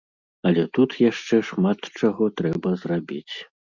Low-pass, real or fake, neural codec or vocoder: 7.2 kHz; real; none